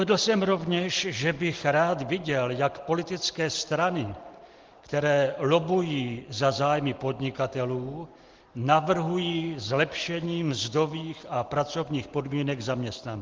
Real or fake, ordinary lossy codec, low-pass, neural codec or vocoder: real; Opus, 16 kbps; 7.2 kHz; none